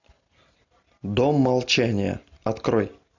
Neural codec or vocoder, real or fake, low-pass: none; real; 7.2 kHz